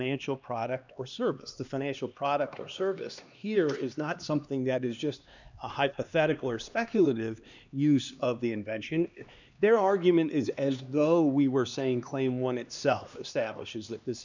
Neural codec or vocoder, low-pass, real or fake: codec, 16 kHz, 2 kbps, X-Codec, HuBERT features, trained on LibriSpeech; 7.2 kHz; fake